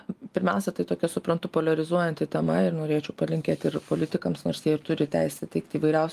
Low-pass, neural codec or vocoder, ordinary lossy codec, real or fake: 14.4 kHz; none; Opus, 32 kbps; real